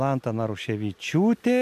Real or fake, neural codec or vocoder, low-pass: real; none; 14.4 kHz